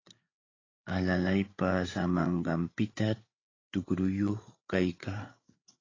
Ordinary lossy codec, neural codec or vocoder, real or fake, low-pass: AAC, 32 kbps; codec, 16 kHz, 8 kbps, FreqCodec, larger model; fake; 7.2 kHz